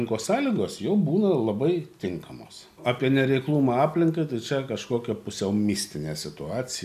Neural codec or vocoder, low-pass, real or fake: none; 14.4 kHz; real